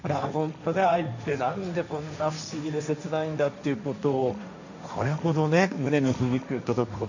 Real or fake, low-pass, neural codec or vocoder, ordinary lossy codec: fake; 7.2 kHz; codec, 16 kHz, 1.1 kbps, Voila-Tokenizer; none